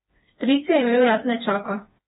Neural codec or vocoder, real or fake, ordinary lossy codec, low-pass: codec, 16 kHz, 2 kbps, FreqCodec, smaller model; fake; AAC, 16 kbps; 7.2 kHz